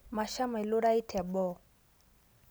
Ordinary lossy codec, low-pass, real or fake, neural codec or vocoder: none; none; real; none